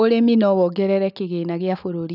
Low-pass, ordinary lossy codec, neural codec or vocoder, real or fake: 5.4 kHz; none; none; real